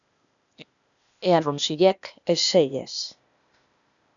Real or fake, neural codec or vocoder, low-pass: fake; codec, 16 kHz, 0.8 kbps, ZipCodec; 7.2 kHz